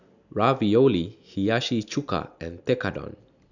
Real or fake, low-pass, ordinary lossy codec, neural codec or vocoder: real; 7.2 kHz; none; none